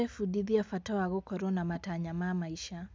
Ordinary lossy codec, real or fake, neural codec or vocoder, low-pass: none; real; none; none